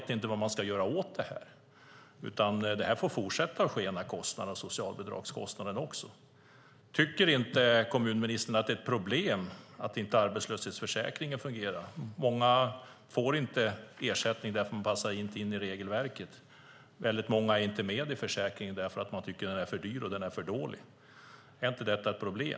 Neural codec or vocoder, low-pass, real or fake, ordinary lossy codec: none; none; real; none